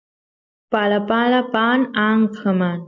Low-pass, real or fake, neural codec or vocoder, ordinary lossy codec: 7.2 kHz; real; none; MP3, 48 kbps